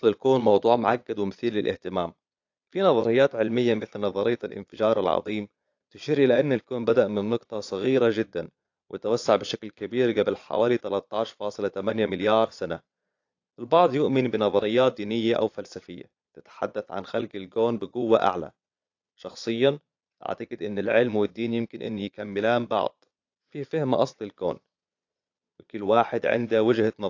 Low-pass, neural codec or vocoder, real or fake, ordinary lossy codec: 7.2 kHz; vocoder, 22.05 kHz, 80 mel bands, Vocos; fake; AAC, 48 kbps